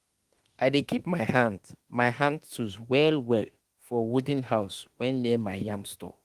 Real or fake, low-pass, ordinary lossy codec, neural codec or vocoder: fake; 14.4 kHz; Opus, 24 kbps; autoencoder, 48 kHz, 32 numbers a frame, DAC-VAE, trained on Japanese speech